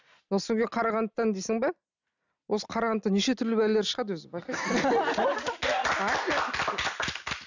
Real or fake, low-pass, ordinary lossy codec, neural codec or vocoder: real; 7.2 kHz; none; none